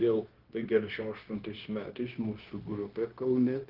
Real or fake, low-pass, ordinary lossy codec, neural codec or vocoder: fake; 5.4 kHz; Opus, 16 kbps; codec, 16 kHz, 0.9 kbps, LongCat-Audio-Codec